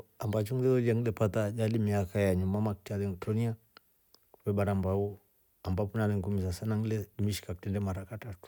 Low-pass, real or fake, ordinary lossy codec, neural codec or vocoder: none; real; none; none